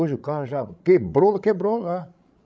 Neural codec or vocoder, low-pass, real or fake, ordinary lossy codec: codec, 16 kHz, 16 kbps, FreqCodec, larger model; none; fake; none